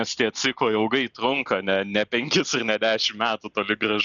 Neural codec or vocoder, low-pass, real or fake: none; 7.2 kHz; real